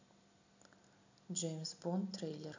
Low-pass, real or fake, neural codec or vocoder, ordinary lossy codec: 7.2 kHz; real; none; AAC, 48 kbps